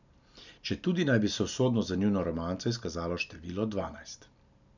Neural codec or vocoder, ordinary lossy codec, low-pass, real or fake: none; none; 7.2 kHz; real